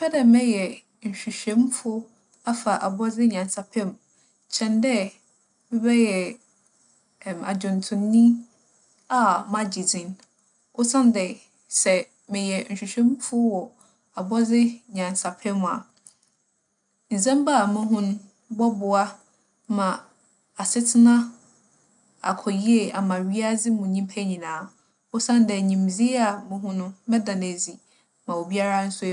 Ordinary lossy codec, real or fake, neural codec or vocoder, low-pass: none; real; none; 9.9 kHz